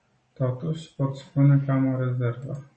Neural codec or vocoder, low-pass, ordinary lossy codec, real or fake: none; 10.8 kHz; MP3, 32 kbps; real